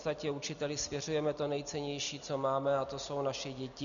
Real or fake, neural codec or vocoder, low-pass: real; none; 7.2 kHz